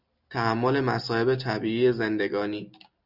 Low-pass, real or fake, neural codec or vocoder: 5.4 kHz; real; none